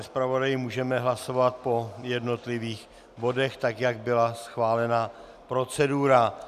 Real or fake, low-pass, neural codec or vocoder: real; 14.4 kHz; none